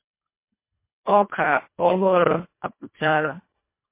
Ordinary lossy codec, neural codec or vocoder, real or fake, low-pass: MP3, 24 kbps; codec, 24 kHz, 1.5 kbps, HILCodec; fake; 3.6 kHz